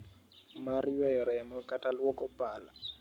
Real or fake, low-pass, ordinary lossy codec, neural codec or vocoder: fake; 19.8 kHz; none; codec, 44.1 kHz, 7.8 kbps, Pupu-Codec